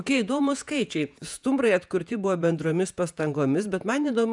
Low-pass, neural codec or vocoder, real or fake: 10.8 kHz; vocoder, 48 kHz, 128 mel bands, Vocos; fake